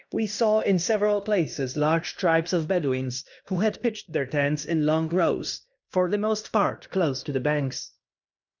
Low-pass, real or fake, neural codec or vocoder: 7.2 kHz; fake; codec, 16 kHz in and 24 kHz out, 0.9 kbps, LongCat-Audio-Codec, fine tuned four codebook decoder